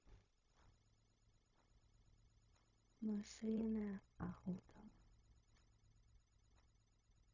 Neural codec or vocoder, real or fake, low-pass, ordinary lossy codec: codec, 16 kHz, 0.4 kbps, LongCat-Audio-Codec; fake; 7.2 kHz; none